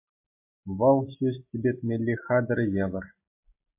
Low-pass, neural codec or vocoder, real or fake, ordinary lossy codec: 3.6 kHz; none; real; MP3, 24 kbps